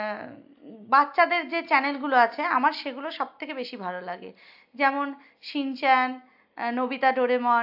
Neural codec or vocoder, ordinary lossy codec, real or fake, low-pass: none; AAC, 48 kbps; real; 5.4 kHz